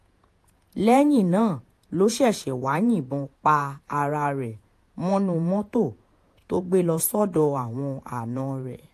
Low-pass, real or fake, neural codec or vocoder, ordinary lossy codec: 14.4 kHz; fake; vocoder, 48 kHz, 128 mel bands, Vocos; AAC, 64 kbps